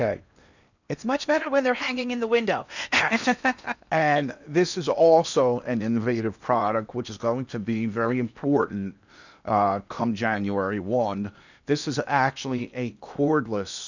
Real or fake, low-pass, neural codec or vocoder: fake; 7.2 kHz; codec, 16 kHz in and 24 kHz out, 0.8 kbps, FocalCodec, streaming, 65536 codes